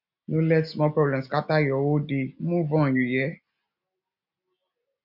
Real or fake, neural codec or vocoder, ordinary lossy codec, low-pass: real; none; none; 5.4 kHz